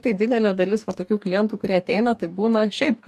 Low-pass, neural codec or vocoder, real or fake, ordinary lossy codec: 14.4 kHz; codec, 44.1 kHz, 2.6 kbps, DAC; fake; AAC, 96 kbps